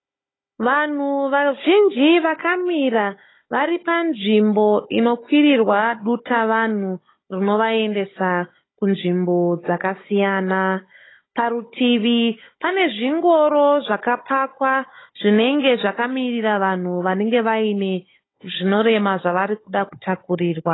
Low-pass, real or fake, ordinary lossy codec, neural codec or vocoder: 7.2 kHz; fake; AAC, 16 kbps; codec, 16 kHz, 16 kbps, FunCodec, trained on Chinese and English, 50 frames a second